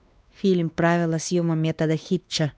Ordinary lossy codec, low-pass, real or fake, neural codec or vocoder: none; none; fake; codec, 16 kHz, 2 kbps, X-Codec, WavLM features, trained on Multilingual LibriSpeech